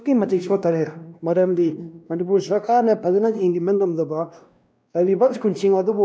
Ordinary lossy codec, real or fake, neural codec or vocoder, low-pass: none; fake; codec, 16 kHz, 1 kbps, X-Codec, WavLM features, trained on Multilingual LibriSpeech; none